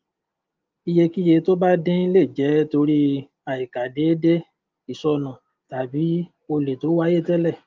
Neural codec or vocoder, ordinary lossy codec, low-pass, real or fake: none; Opus, 32 kbps; 7.2 kHz; real